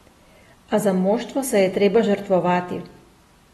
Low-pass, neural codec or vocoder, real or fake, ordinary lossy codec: 19.8 kHz; none; real; AAC, 32 kbps